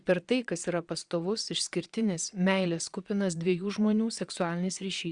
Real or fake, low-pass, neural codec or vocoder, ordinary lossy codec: fake; 9.9 kHz; vocoder, 22.05 kHz, 80 mel bands, Vocos; Opus, 64 kbps